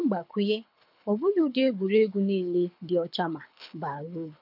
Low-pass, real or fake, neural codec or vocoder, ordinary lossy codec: 5.4 kHz; fake; codec, 24 kHz, 6 kbps, HILCodec; none